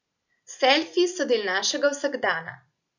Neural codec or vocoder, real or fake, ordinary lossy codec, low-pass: none; real; none; 7.2 kHz